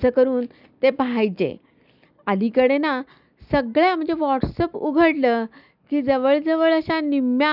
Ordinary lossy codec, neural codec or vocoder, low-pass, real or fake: none; none; 5.4 kHz; real